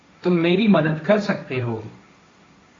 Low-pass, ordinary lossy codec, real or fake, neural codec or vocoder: 7.2 kHz; AAC, 32 kbps; fake; codec, 16 kHz, 1.1 kbps, Voila-Tokenizer